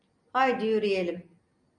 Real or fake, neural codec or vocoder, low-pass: real; none; 9.9 kHz